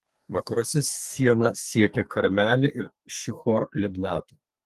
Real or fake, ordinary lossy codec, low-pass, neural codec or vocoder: fake; Opus, 24 kbps; 14.4 kHz; codec, 32 kHz, 1.9 kbps, SNAC